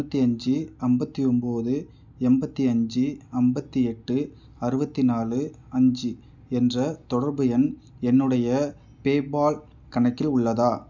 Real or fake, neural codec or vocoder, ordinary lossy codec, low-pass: real; none; none; 7.2 kHz